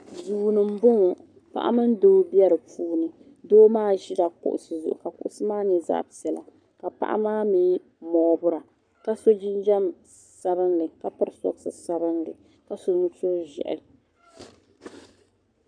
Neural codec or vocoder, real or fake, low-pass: codec, 44.1 kHz, 7.8 kbps, Pupu-Codec; fake; 9.9 kHz